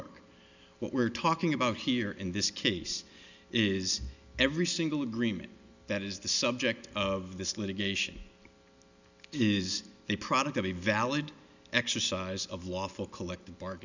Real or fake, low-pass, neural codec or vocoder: real; 7.2 kHz; none